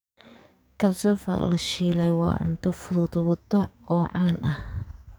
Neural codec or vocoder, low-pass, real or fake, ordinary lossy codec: codec, 44.1 kHz, 2.6 kbps, SNAC; none; fake; none